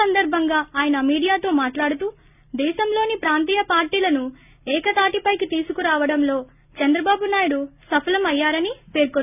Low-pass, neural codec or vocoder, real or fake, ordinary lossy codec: 3.6 kHz; none; real; none